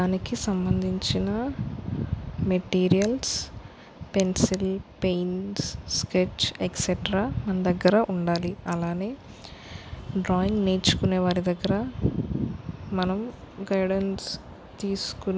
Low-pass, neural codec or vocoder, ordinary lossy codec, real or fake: none; none; none; real